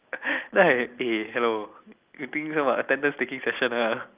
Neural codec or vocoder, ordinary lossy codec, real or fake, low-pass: none; Opus, 24 kbps; real; 3.6 kHz